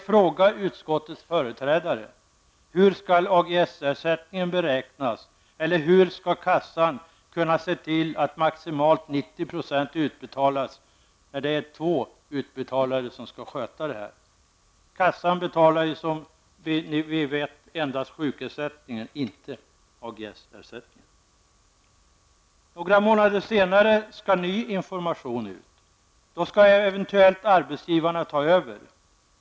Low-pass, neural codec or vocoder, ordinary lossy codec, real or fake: none; none; none; real